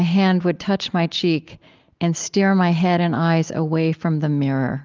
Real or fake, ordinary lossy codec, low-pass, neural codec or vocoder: real; Opus, 24 kbps; 7.2 kHz; none